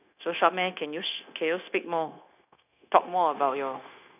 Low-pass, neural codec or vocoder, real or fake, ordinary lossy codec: 3.6 kHz; codec, 16 kHz, 0.9 kbps, LongCat-Audio-Codec; fake; none